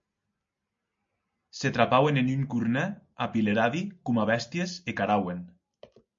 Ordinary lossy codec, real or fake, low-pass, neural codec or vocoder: MP3, 48 kbps; real; 7.2 kHz; none